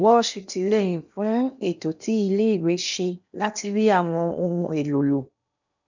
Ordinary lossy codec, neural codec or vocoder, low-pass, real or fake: none; codec, 16 kHz in and 24 kHz out, 0.8 kbps, FocalCodec, streaming, 65536 codes; 7.2 kHz; fake